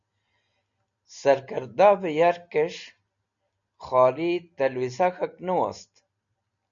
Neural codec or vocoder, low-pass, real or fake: none; 7.2 kHz; real